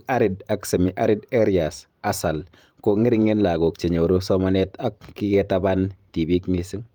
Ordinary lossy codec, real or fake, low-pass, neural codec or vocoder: Opus, 32 kbps; fake; 19.8 kHz; vocoder, 44.1 kHz, 128 mel bands every 512 samples, BigVGAN v2